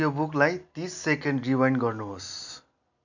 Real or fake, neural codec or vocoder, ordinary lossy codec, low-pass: real; none; none; 7.2 kHz